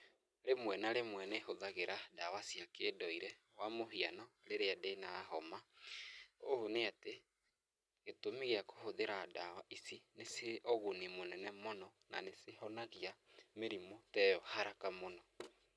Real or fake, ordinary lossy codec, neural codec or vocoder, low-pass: real; none; none; none